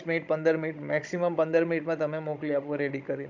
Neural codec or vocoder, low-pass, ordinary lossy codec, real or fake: codec, 16 kHz, 16 kbps, FunCodec, trained on Chinese and English, 50 frames a second; 7.2 kHz; MP3, 64 kbps; fake